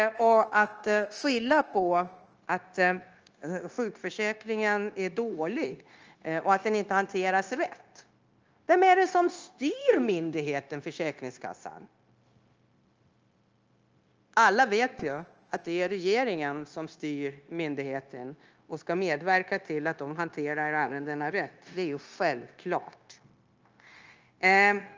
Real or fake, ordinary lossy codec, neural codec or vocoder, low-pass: fake; Opus, 24 kbps; codec, 16 kHz, 0.9 kbps, LongCat-Audio-Codec; 7.2 kHz